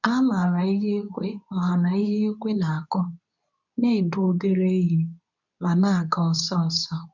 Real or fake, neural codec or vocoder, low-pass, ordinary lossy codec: fake; codec, 24 kHz, 0.9 kbps, WavTokenizer, medium speech release version 2; 7.2 kHz; none